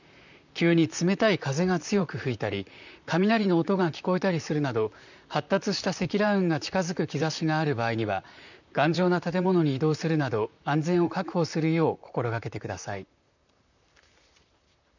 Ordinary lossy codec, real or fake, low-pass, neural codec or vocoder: none; fake; 7.2 kHz; vocoder, 44.1 kHz, 128 mel bands, Pupu-Vocoder